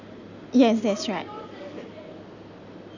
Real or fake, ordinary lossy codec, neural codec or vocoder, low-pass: real; none; none; 7.2 kHz